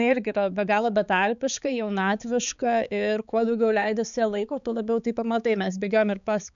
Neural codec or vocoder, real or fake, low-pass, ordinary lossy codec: codec, 16 kHz, 4 kbps, X-Codec, HuBERT features, trained on balanced general audio; fake; 7.2 kHz; MP3, 96 kbps